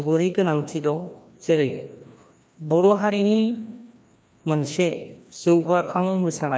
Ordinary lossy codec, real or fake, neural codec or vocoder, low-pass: none; fake; codec, 16 kHz, 1 kbps, FreqCodec, larger model; none